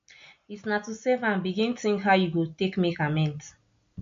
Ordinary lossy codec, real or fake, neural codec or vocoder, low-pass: MP3, 48 kbps; real; none; 7.2 kHz